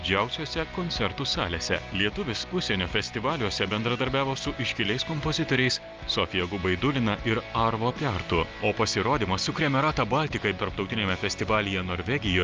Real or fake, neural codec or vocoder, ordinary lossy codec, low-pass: real; none; Opus, 24 kbps; 7.2 kHz